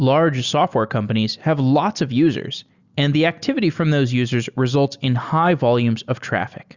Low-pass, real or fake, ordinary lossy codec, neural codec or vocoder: 7.2 kHz; real; Opus, 64 kbps; none